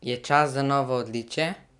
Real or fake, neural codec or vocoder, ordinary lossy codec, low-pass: real; none; none; 10.8 kHz